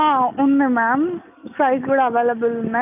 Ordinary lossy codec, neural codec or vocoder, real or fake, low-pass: none; none; real; 3.6 kHz